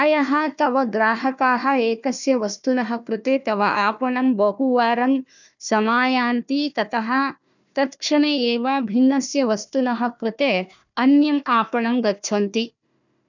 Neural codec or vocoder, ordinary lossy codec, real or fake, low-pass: codec, 16 kHz, 1 kbps, FunCodec, trained on Chinese and English, 50 frames a second; none; fake; 7.2 kHz